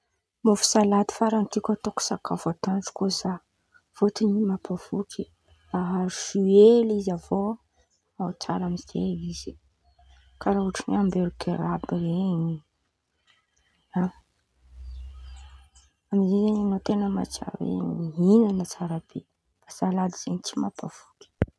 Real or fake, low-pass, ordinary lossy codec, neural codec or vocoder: real; none; none; none